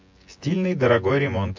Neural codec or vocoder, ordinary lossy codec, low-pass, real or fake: vocoder, 24 kHz, 100 mel bands, Vocos; MP3, 48 kbps; 7.2 kHz; fake